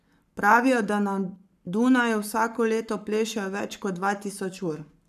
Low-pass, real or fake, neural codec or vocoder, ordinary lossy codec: 14.4 kHz; fake; codec, 44.1 kHz, 7.8 kbps, Pupu-Codec; none